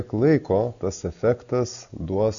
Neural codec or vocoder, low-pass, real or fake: none; 7.2 kHz; real